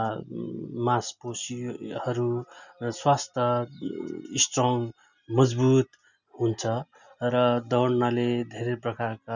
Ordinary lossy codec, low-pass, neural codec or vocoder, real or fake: none; 7.2 kHz; none; real